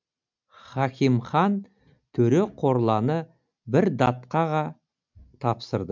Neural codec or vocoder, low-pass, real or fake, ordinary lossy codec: none; 7.2 kHz; real; MP3, 64 kbps